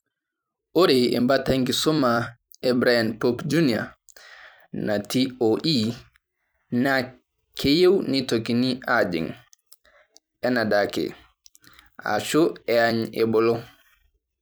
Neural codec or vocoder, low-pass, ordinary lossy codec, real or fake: vocoder, 44.1 kHz, 128 mel bands every 256 samples, BigVGAN v2; none; none; fake